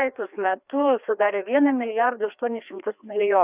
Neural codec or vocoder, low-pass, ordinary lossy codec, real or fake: codec, 16 kHz, 2 kbps, FreqCodec, larger model; 3.6 kHz; Opus, 64 kbps; fake